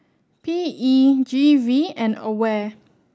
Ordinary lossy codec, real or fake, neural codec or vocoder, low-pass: none; real; none; none